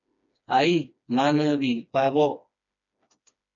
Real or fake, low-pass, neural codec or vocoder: fake; 7.2 kHz; codec, 16 kHz, 2 kbps, FreqCodec, smaller model